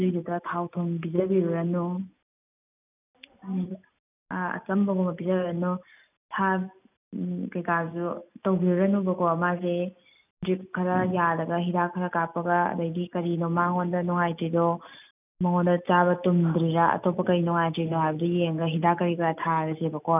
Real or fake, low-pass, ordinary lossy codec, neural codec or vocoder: real; 3.6 kHz; none; none